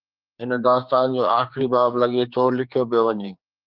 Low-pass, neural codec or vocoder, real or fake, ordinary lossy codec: 5.4 kHz; codec, 16 kHz, 4 kbps, X-Codec, HuBERT features, trained on general audio; fake; Opus, 24 kbps